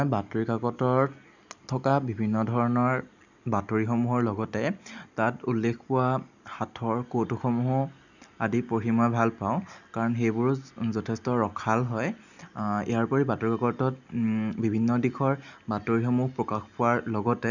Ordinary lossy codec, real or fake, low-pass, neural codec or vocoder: none; real; 7.2 kHz; none